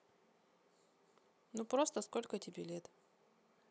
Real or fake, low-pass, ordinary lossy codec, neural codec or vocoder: real; none; none; none